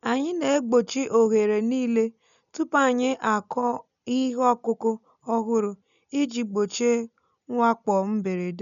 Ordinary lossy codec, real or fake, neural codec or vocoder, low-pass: none; real; none; 7.2 kHz